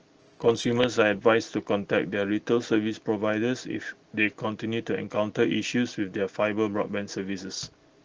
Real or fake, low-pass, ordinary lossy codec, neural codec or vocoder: real; 7.2 kHz; Opus, 16 kbps; none